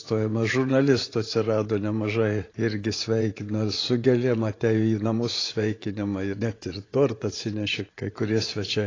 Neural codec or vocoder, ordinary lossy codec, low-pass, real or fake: vocoder, 24 kHz, 100 mel bands, Vocos; AAC, 32 kbps; 7.2 kHz; fake